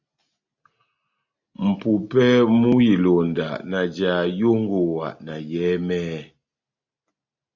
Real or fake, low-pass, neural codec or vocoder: real; 7.2 kHz; none